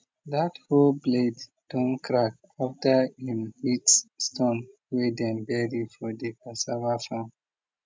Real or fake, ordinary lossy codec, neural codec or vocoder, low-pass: real; none; none; none